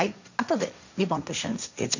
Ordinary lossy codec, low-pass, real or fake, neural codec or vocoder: none; 7.2 kHz; fake; codec, 16 kHz, 1.1 kbps, Voila-Tokenizer